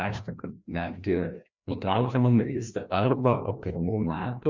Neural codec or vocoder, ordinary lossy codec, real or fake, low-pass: codec, 16 kHz, 1 kbps, FreqCodec, larger model; MP3, 64 kbps; fake; 7.2 kHz